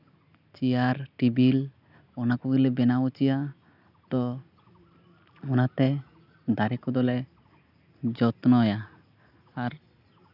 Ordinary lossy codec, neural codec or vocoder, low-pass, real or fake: none; none; 5.4 kHz; real